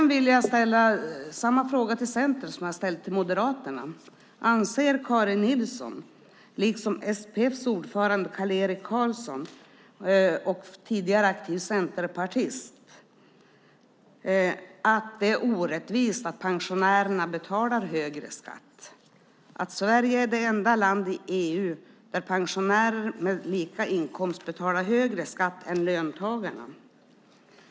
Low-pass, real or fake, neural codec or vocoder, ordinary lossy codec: none; real; none; none